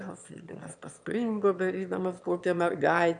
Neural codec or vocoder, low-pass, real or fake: autoencoder, 22.05 kHz, a latent of 192 numbers a frame, VITS, trained on one speaker; 9.9 kHz; fake